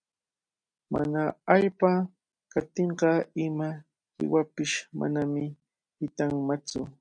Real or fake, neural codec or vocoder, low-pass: real; none; 9.9 kHz